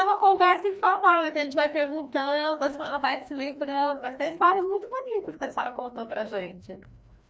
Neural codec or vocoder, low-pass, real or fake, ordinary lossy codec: codec, 16 kHz, 1 kbps, FreqCodec, larger model; none; fake; none